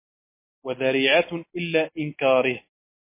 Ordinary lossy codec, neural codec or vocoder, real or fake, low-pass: MP3, 24 kbps; none; real; 3.6 kHz